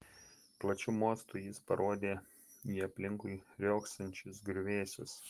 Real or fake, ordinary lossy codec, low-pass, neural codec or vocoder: real; Opus, 24 kbps; 14.4 kHz; none